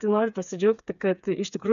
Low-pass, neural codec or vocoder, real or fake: 7.2 kHz; codec, 16 kHz, 4 kbps, FreqCodec, smaller model; fake